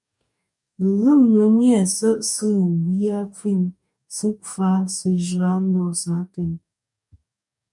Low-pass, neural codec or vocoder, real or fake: 10.8 kHz; codec, 44.1 kHz, 2.6 kbps, DAC; fake